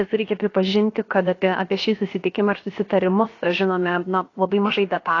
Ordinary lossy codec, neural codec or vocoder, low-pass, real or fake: AAC, 32 kbps; codec, 16 kHz, about 1 kbps, DyCAST, with the encoder's durations; 7.2 kHz; fake